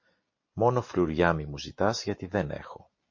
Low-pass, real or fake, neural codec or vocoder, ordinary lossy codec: 7.2 kHz; real; none; MP3, 32 kbps